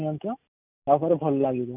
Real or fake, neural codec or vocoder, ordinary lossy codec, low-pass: real; none; none; 3.6 kHz